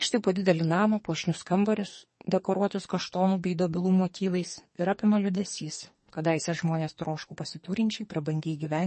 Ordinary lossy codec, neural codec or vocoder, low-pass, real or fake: MP3, 32 kbps; codec, 44.1 kHz, 2.6 kbps, SNAC; 10.8 kHz; fake